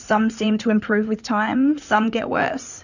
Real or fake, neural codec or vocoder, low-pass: fake; codec, 16 kHz in and 24 kHz out, 2.2 kbps, FireRedTTS-2 codec; 7.2 kHz